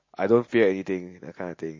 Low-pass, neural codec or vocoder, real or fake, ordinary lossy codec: 7.2 kHz; none; real; MP3, 32 kbps